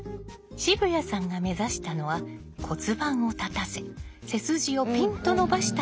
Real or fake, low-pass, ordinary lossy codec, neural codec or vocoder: real; none; none; none